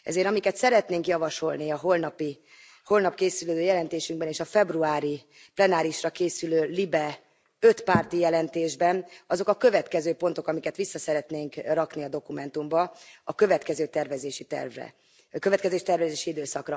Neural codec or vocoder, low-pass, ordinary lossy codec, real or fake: none; none; none; real